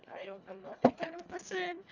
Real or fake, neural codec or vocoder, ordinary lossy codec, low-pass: fake; codec, 24 kHz, 1.5 kbps, HILCodec; none; 7.2 kHz